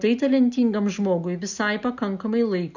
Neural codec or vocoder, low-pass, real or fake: none; 7.2 kHz; real